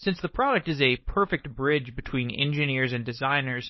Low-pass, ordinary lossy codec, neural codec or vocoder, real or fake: 7.2 kHz; MP3, 24 kbps; codec, 16 kHz, 4.8 kbps, FACodec; fake